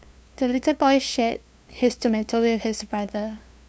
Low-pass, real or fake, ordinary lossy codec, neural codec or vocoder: none; fake; none; codec, 16 kHz, 2 kbps, FunCodec, trained on LibriTTS, 25 frames a second